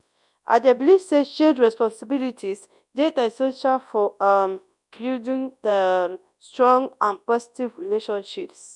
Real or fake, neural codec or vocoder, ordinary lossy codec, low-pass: fake; codec, 24 kHz, 0.9 kbps, WavTokenizer, large speech release; none; 10.8 kHz